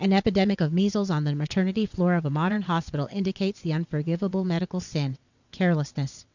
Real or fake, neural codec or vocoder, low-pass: fake; vocoder, 22.05 kHz, 80 mel bands, WaveNeXt; 7.2 kHz